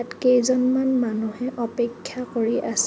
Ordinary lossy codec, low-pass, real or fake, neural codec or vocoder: none; none; real; none